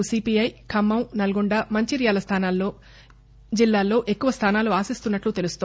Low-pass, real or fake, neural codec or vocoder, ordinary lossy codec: none; real; none; none